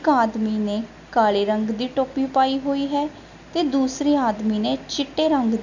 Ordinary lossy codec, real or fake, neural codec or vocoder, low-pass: none; real; none; 7.2 kHz